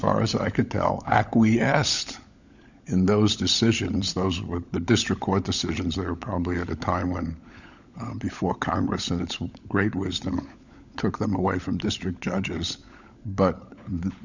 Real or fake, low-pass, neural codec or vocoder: fake; 7.2 kHz; codec, 16 kHz, 16 kbps, FunCodec, trained on LibriTTS, 50 frames a second